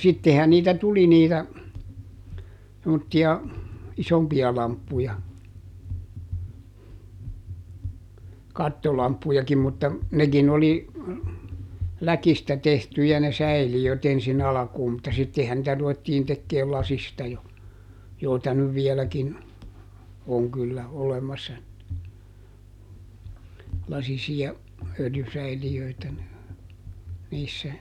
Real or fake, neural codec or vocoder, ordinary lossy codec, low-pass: real; none; none; 19.8 kHz